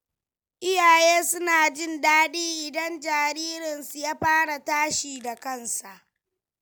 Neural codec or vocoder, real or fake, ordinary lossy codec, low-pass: none; real; none; none